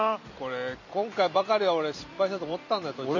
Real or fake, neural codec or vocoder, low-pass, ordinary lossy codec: fake; vocoder, 44.1 kHz, 128 mel bands every 256 samples, BigVGAN v2; 7.2 kHz; none